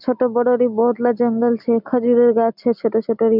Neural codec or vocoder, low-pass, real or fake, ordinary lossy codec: none; 5.4 kHz; real; none